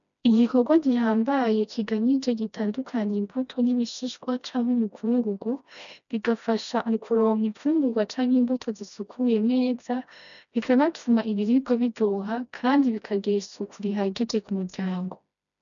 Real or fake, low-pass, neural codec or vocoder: fake; 7.2 kHz; codec, 16 kHz, 1 kbps, FreqCodec, smaller model